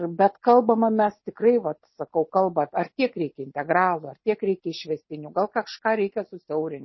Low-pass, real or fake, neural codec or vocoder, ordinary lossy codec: 7.2 kHz; real; none; MP3, 24 kbps